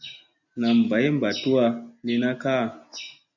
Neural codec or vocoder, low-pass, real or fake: none; 7.2 kHz; real